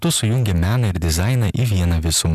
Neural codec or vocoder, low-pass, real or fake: none; 14.4 kHz; real